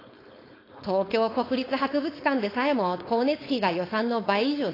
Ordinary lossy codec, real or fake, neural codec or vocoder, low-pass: AAC, 24 kbps; fake; codec, 16 kHz, 4.8 kbps, FACodec; 5.4 kHz